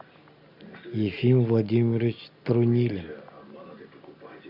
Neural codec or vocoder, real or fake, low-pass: none; real; 5.4 kHz